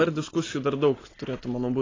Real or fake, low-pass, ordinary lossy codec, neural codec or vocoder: real; 7.2 kHz; AAC, 32 kbps; none